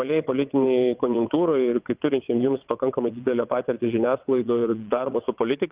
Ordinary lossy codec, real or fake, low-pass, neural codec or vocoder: Opus, 32 kbps; fake; 3.6 kHz; vocoder, 22.05 kHz, 80 mel bands, WaveNeXt